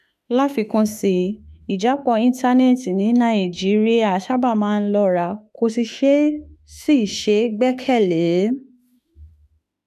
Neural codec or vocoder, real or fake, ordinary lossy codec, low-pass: autoencoder, 48 kHz, 32 numbers a frame, DAC-VAE, trained on Japanese speech; fake; none; 14.4 kHz